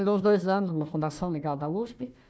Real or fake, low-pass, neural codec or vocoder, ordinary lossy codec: fake; none; codec, 16 kHz, 1 kbps, FunCodec, trained on Chinese and English, 50 frames a second; none